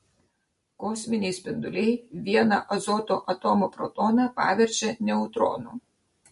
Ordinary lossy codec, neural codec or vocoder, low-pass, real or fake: MP3, 48 kbps; none; 14.4 kHz; real